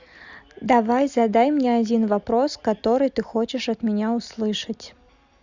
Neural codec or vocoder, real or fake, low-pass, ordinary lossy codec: none; real; 7.2 kHz; Opus, 64 kbps